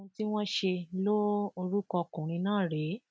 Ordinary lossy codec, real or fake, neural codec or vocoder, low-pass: none; real; none; none